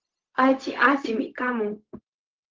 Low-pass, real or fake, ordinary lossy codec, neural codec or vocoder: 7.2 kHz; fake; Opus, 16 kbps; codec, 16 kHz, 0.4 kbps, LongCat-Audio-Codec